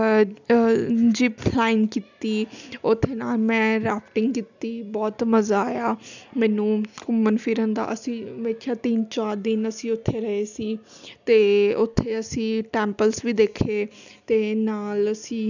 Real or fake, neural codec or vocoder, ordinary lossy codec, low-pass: real; none; none; 7.2 kHz